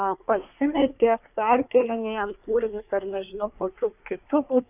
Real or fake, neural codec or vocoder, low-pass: fake; codec, 24 kHz, 1 kbps, SNAC; 3.6 kHz